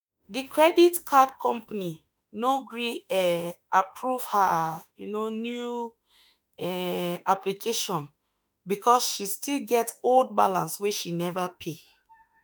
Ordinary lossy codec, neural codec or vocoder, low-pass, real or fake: none; autoencoder, 48 kHz, 32 numbers a frame, DAC-VAE, trained on Japanese speech; none; fake